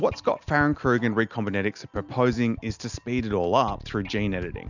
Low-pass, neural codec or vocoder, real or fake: 7.2 kHz; none; real